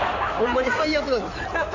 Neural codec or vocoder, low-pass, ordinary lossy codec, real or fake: codec, 16 kHz in and 24 kHz out, 2.2 kbps, FireRedTTS-2 codec; 7.2 kHz; AAC, 48 kbps; fake